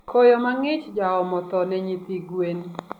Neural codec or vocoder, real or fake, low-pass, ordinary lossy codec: none; real; 19.8 kHz; none